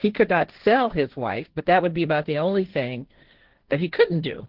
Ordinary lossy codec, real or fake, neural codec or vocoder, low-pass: Opus, 16 kbps; fake; codec, 16 kHz, 1.1 kbps, Voila-Tokenizer; 5.4 kHz